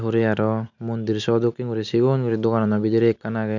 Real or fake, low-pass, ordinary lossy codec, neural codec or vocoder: real; 7.2 kHz; none; none